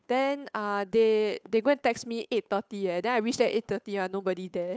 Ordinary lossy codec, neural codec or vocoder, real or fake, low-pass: none; codec, 16 kHz, 8 kbps, FunCodec, trained on Chinese and English, 25 frames a second; fake; none